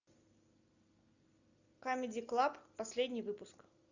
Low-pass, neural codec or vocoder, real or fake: 7.2 kHz; none; real